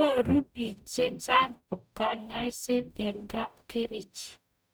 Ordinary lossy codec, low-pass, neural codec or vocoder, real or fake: none; none; codec, 44.1 kHz, 0.9 kbps, DAC; fake